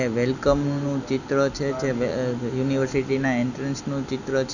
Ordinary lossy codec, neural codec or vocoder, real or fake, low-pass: none; none; real; 7.2 kHz